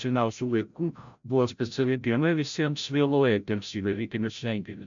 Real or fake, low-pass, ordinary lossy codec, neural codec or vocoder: fake; 7.2 kHz; MP3, 64 kbps; codec, 16 kHz, 0.5 kbps, FreqCodec, larger model